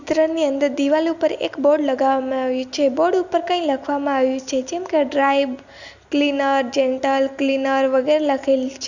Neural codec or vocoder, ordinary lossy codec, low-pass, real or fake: none; none; 7.2 kHz; real